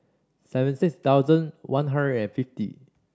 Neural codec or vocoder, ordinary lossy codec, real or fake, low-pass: none; none; real; none